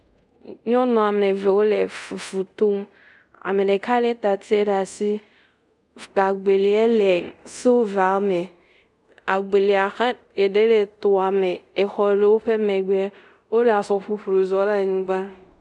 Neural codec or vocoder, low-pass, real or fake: codec, 24 kHz, 0.5 kbps, DualCodec; 10.8 kHz; fake